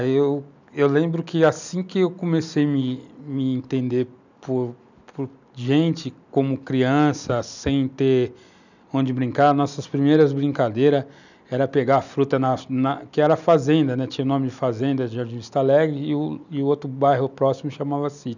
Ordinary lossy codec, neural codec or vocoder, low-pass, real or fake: none; none; 7.2 kHz; real